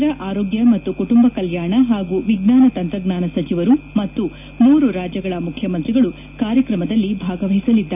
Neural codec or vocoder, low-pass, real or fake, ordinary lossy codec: none; 3.6 kHz; real; none